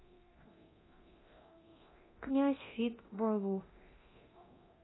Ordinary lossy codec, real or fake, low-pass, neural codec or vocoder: AAC, 16 kbps; fake; 7.2 kHz; codec, 24 kHz, 0.9 kbps, DualCodec